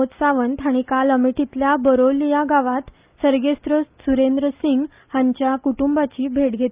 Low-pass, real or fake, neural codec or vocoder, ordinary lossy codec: 3.6 kHz; real; none; Opus, 24 kbps